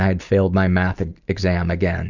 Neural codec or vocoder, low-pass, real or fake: none; 7.2 kHz; real